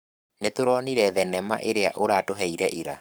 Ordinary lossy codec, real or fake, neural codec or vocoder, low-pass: none; fake; codec, 44.1 kHz, 7.8 kbps, Pupu-Codec; none